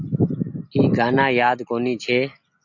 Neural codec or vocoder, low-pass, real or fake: none; 7.2 kHz; real